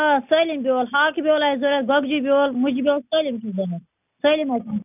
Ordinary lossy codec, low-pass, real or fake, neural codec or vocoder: none; 3.6 kHz; real; none